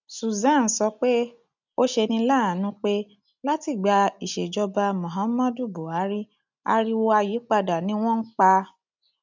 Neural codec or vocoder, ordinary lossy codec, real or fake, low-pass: none; none; real; 7.2 kHz